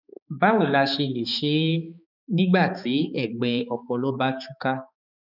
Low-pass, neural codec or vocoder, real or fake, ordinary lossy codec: 5.4 kHz; codec, 16 kHz, 4 kbps, X-Codec, HuBERT features, trained on balanced general audio; fake; none